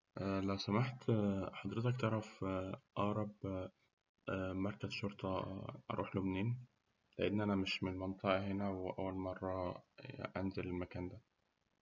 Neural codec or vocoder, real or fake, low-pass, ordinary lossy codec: none; real; 7.2 kHz; none